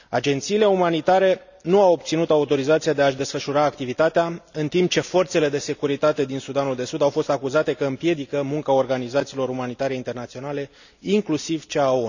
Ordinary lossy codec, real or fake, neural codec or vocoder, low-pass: none; real; none; 7.2 kHz